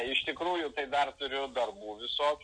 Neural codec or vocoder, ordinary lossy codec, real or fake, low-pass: none; MP3, 96 kbps; real; 9.9 kHz